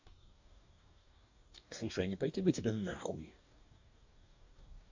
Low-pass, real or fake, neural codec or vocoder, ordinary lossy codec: 7.2 kHz; fake; codec, 44.1 kHz, 2.6 kbps, DAC; AAC, 48 kbps